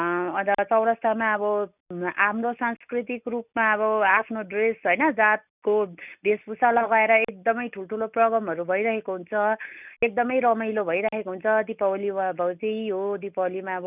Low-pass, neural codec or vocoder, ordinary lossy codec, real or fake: 3.6 kHz; none; none; real